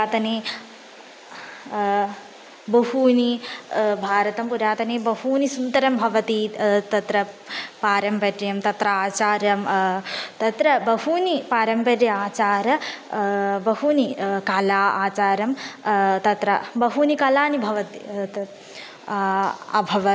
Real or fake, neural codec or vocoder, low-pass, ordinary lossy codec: real; none; none; none